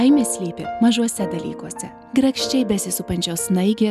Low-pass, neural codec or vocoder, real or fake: 14.4 kHz; none; real